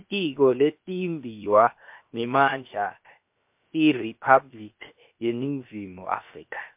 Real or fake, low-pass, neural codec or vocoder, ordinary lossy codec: fake; 3.6 kHz; codec, 16 kHz, 0.7 kbps, FocalCodec; MP3, 32 kbps